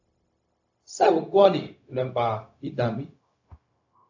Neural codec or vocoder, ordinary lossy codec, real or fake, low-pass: codec, 16 kHz, 0.4 kbps, LongCat-Audio-Codec; AAC, 48 kbps; fake; 7.2 kHz